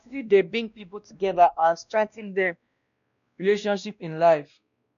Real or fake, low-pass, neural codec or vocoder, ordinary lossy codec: fake; 7.2 kHz; codec, 16 kHz, 1 kbps, X-Codec, WavLM features, trained on Multilingual LibriSpeech; none